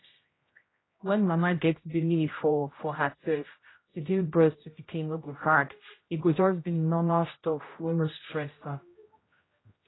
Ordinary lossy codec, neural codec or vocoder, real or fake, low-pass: AAC, 16 kbps; codec, 16 kHz, 0.5 kbps, X-Codec, HuBERT features, trained on general audio; fake; 7.2 kHz